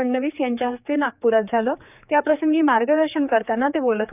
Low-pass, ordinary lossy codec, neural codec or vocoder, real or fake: 3.6 kHz; none; codec, 16 kHz, 4 kbps, X-Codec, HuBERT features, trained on general audio; fake